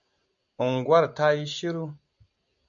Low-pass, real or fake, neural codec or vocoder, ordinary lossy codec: 7.2 kHz; real; none; MP3, 64 kbps